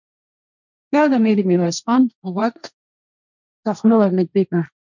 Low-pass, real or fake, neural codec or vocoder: 7.2 kHz; fake; codec, 16 kHz, 1.1 kbps, Voila-Tokenizer